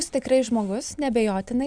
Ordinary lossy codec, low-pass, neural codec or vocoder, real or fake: Opus, 64 kbps; 9.9 kHz; none; real